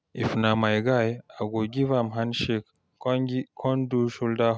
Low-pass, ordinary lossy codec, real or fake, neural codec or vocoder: none; none; real; none